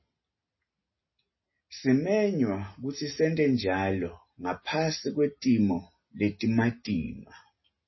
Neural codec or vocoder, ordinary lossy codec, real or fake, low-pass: none; MP3, 24 kbps; real; 7.2 kHz